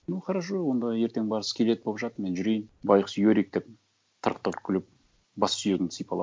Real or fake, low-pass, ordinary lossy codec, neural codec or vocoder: real; none; none; none